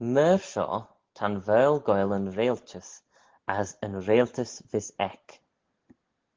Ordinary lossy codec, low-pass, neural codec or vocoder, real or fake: Opus, 16 kbps; 7.2 kHz; none; real